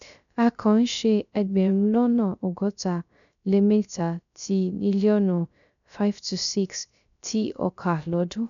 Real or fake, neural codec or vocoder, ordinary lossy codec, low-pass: fake; codec, 16 kHz, 0.3 kbps, FocalCodec; none; 7.2 kHz